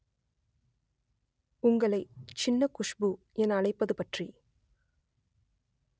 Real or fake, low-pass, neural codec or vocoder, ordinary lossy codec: real; none; none; none